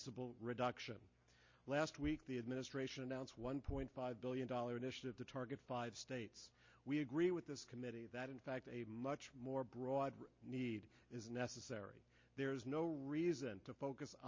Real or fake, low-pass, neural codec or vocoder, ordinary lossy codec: real; 7.2 kHz; none; MP3, 32 kbps